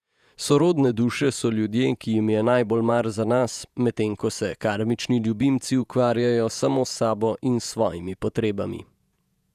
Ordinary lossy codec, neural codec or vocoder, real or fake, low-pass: none; vocoder, 48 kHz, 128 mel bands, Vocos; fake; 14.4 kHz